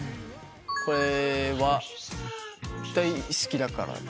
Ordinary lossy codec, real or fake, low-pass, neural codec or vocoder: none; real; none; none